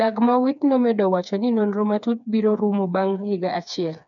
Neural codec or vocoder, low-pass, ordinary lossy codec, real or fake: codec, 16 kHz, 4 kbps, FreqCodec, smaller model; 7.2 kHz; none; fake